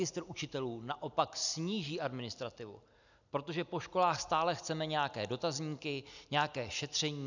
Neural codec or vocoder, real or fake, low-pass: none; real; 7.2 kHz